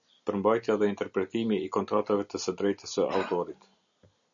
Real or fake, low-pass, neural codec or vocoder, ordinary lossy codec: real; 7.2 kHz; none; MP3, 96 kbps